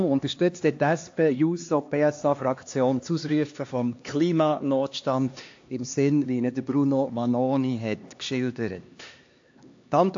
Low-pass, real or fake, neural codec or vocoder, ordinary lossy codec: 7.2 kHz; fake; codec, 16 kHz, 2 kbps, X-Codec, HuBERT features, trained on LibriSpeech; AAC, 48 kbps